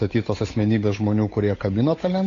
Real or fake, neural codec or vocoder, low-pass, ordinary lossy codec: fake; codec, 16 kHz, 8 kbps, FunCodec, trained on Chinese and English, 25 frames a second; 7.2 kHz; AAC, 32 kbps